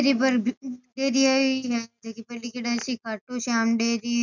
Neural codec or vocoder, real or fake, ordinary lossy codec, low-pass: none; real; none; 7.2 kHz